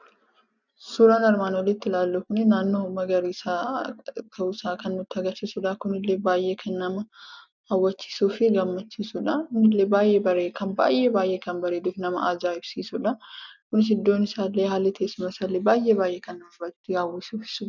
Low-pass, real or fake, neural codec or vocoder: 7.2 kHz; real; none